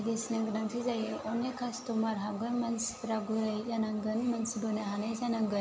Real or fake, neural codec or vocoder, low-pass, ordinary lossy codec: real; none; none; none